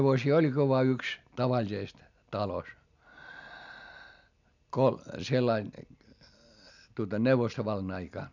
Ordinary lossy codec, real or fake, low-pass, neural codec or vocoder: none; real; 7.2 kHz; none